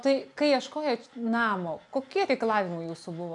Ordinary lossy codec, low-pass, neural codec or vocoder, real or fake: MP3, 96 kbps; 10.8 kHz; none; real